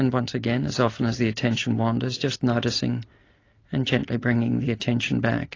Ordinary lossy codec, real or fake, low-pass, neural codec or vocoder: AAC, 32 kbps; real; 7.2 kHz; none